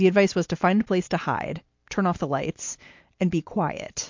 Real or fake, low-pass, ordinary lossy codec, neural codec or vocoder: real; 7.2 kHz; MP3, 48 kbps; none